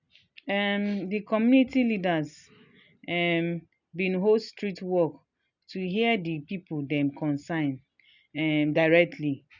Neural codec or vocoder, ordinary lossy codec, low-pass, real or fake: none; none; 7.2 kHz; real